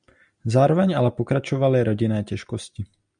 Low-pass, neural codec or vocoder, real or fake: 9.9 kHz; none; real